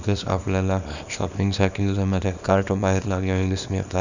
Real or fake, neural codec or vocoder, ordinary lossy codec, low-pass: fake; codec, 24 kHz, 0.9 kbps, WavTokenizer, small release; none; 7.2 kHz